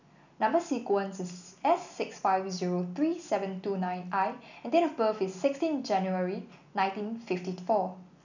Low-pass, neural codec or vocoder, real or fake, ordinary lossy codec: 7.2 kHz; none; real; none